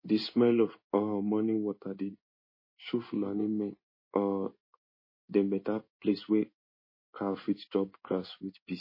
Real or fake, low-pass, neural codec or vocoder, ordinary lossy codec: fake; 5.4 kHz; codec, 16 kHz in and 24 kHz out, 1 kbps, XY-Tokenizer; MP3, 24 kbps